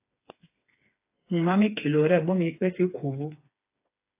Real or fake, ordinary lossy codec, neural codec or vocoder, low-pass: fake; AAC, 24 kbps; codec, 16 kHz, 4 kbps, FreqCodec, smaller model; 3.6 kHz